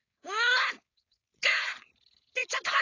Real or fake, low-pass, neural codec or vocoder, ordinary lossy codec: fake; 7.2 kHz; codec, 16 kHz, 4.8 kbps, FACodec; AAC, 32 kbps